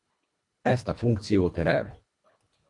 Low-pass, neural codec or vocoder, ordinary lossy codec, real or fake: 10.8 kHz; codec, 24 kHz, 1.5 kbps, HILCodec; MP3, 64 kbps; fake